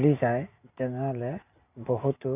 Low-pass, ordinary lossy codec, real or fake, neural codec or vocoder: 3.6 kHz; none; real; none